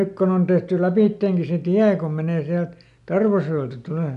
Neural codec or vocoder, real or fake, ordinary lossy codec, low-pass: none; real; none; 10.8 kHz